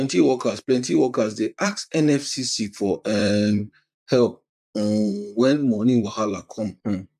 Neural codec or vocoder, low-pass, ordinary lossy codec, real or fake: vocoder, 44.1 kHz, 128 mel bands, Pupu-Vocoder; 14.4 kHz; none; fake